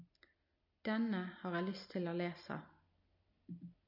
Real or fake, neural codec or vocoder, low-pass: real; none; 5.4 kHz